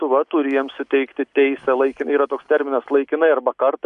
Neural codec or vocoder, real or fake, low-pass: none; real; 10.8 kHz